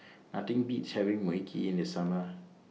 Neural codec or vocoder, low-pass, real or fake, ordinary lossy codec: none; none; real; none